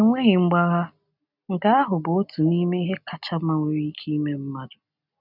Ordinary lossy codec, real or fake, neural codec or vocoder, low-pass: none; real; none; 5.4 kHz